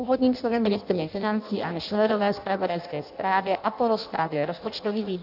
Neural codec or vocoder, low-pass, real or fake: codec, 16 kHz in and 24 kHz out, 0.6 kbps, FireRedTTS-2 codec; 5.4 kHz; fake